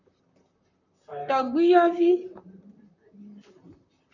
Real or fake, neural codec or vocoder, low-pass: fake; vocoder, 44.1 kHz, 128 mel bands, Pupu-Vocoder; 7.2 kHz